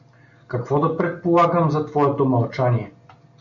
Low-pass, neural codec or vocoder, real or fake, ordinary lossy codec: 7.2 kHz; none; real; MP3, 48 kbps